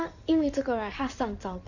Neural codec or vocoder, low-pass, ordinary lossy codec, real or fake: codec, 16 kHz in and 24 kHz out, 2.2 kbps, FireRedTTS-2 codec; 7.2 kHz; none; fake